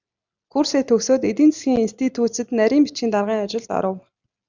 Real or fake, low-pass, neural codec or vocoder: real; 7.2 kHz; none